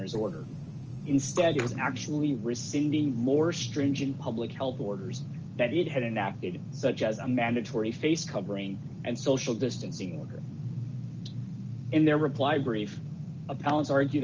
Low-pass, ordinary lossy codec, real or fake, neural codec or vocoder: 7.2 kHz; Opus, 24 kbps; real; none